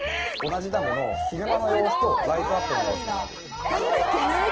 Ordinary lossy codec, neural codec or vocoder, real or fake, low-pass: Opus, 16 kbps; none; real; 7.2 kHz